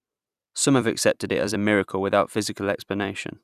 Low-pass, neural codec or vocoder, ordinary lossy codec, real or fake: 14.4 kHz; vocoder, 44.1 kHz, 128 mel bands every 256 samples, BigVGAN v2; none; fake